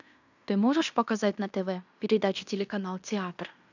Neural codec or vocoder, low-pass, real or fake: codec, 16 kHz in and 24 kHz out, 0.9 kbps, LongCat-Audio-Codec, fine tuned four codebook decoder; 7.2 kHz; fake